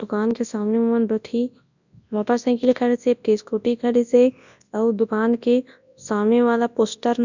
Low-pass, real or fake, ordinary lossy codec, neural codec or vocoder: 7.2 kHz; fake; none; codec, 24 kHz, 0.9 kbps, WavTokenizer, large speech release